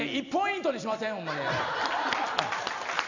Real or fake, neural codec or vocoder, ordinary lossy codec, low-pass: real; none; none; 7.2 kHz